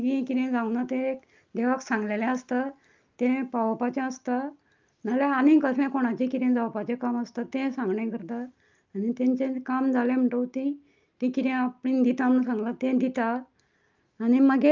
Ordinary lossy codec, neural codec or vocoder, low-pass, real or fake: Opus, 24 kbps; none; 7.2 kHz; real